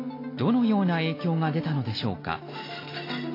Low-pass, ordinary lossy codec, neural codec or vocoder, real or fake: 5.4 kHz; AAC, 24 kbps; none; real